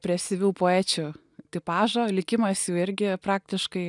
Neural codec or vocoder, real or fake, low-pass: none; real; 10.8 kHz